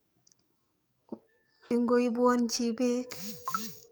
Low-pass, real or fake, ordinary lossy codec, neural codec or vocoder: none; fake; none; codec, 44.1 kHz, 7.8 kbps, DAC